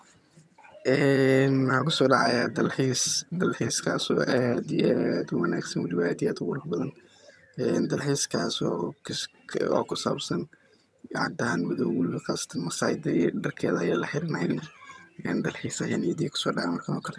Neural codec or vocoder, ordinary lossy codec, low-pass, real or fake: vocoder, 22.05 kHz, 80 mel bands, HiFi-GAN; none; none; fake